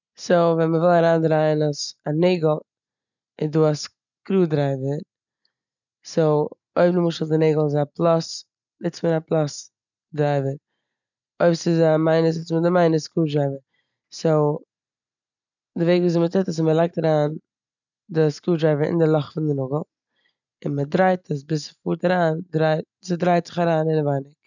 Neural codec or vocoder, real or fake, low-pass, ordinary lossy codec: none; real; 7.2 kHz; none